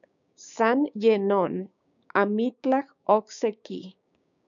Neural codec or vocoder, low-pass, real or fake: codec, 16 kHz, 6 kbps, DAC; 7.2 kHz; fake